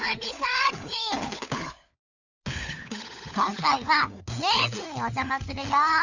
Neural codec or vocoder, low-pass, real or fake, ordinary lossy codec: codec, 16 kHz, 4 kbps, FunCodec, trained on LibriTTS, 50 frames a second; 7.2 kHz; fake; none